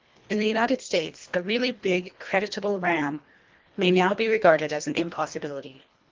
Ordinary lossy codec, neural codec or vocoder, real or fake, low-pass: Opus, 32 kbps; codec, 24 kHz, 1.5 kbps, HILCodec; fake; 7.2 kHz